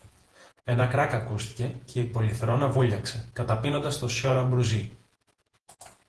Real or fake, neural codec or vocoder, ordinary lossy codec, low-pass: fake; vocoder, 48 kHz, 128 mel bands, Vocos; Opus, 16 kbps; 10.8 kHz